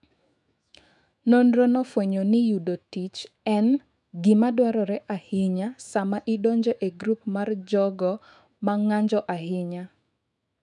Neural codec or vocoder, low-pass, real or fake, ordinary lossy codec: autoencoder, 48 kHz, 128 numbers a frame, DAC-VAE, trained on Japanese speech; 10.8 kHz; fake; none